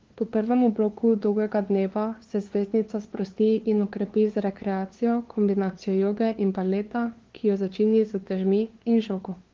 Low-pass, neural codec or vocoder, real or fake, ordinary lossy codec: 7.2 kHz; codec, 16 kHz, 2 kbps, FunCodec, trained on LibriTTS, 25 frames a second; fake; Opus, 16 kbps